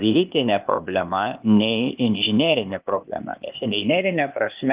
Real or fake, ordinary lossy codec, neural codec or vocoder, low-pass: fake; Opus, 32 kbps; codec, 16 kHz, 0.8 kbps, ZipCodec; 3.6 kHz